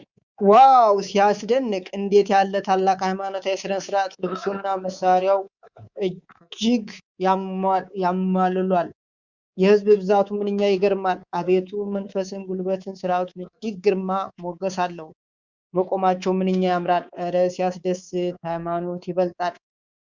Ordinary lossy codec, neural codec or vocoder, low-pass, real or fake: Opus, 64 kbps; codec, 24 kHz, 3.1 kbps, DualCodec; 7.2 kHz; fake